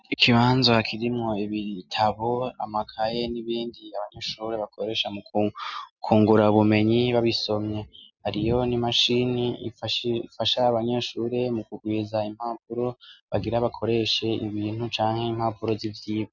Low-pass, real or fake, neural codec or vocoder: 7.2 kHz; real; none